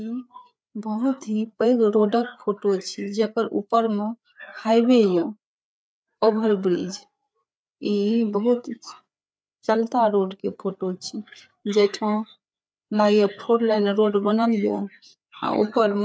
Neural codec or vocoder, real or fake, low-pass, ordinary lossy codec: codec, 16 kHz, 4 kbps, FreqCodec, larger model; fake; none; none